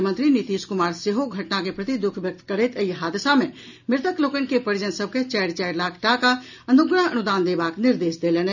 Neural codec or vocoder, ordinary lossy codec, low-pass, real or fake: none; none; 7.2 kHz; real